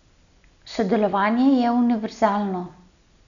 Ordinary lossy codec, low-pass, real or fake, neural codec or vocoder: none; 7.2 kHz; real; none